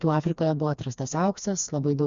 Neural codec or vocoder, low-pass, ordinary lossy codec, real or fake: codec, 16 kHz, 2 kbps, FreqCodec, smaller model; 7.2 kHz; Opus, 64 kbps; fake